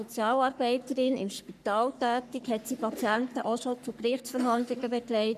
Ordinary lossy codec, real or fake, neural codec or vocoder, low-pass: none; fake; codec, 44.1 kHz, 3.4 kbps, Pupu-Codec; 14.4 kHz